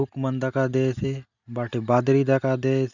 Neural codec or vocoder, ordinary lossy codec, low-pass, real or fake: none; none; 7.2 kHz; real